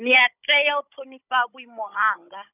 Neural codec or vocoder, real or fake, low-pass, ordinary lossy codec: codec, 16 kHz, 16 kbps, FunCodec, trained on Chinese and English, 50 frames a second; fake; 3.6 kHz; none